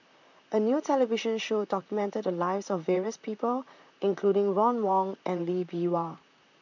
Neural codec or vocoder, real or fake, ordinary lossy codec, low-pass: vocoder, 44.1 kHz, 128 mel bands, Pupu-Vocoder; fake; none; 7.2 kHz